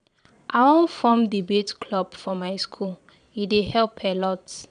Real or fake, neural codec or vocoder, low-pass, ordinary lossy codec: fake; vocoder, 22.05 kHz, 80 mel bands, Vocos; 9.9 kHz; none